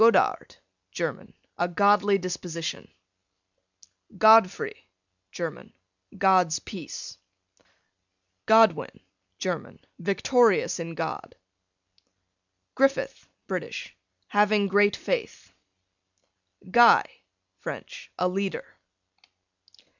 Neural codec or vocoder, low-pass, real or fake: none; 7.2 kHz; real